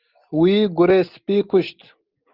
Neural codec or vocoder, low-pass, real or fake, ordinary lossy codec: none; 5.4 kHz; real; Opus, 24 kbps